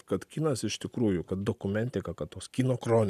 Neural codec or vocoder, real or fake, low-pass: none; real; 14.4 kHz